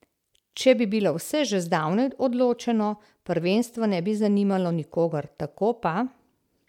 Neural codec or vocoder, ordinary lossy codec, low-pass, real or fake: none; MP3, 96 kbps; 19.8 kHz; real